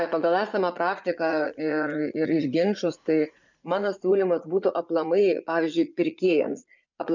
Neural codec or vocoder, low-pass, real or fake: vocoder, 44.1 kHz, 80 mel bands, Vocos; 7.2 kHz; fake